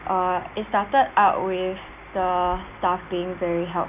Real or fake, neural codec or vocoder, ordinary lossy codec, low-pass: real; none; none; 3.6 kHz